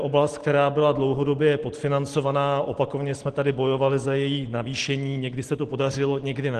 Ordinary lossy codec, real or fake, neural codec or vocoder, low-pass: Opus, 16 kbps; real; none; 10.8 kHz